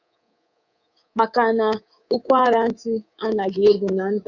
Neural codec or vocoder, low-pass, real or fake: codec, 24 kHz, 3.1 kbps, DualCodec; 7.2 kHz; fake